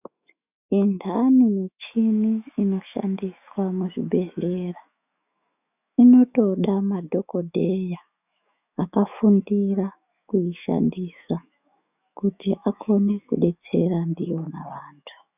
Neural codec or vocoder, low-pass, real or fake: autoencoder, 48 kHz, 128 numbers a frame, DAC-VAE, trained on Japanese speech; 3.6 kHz; fake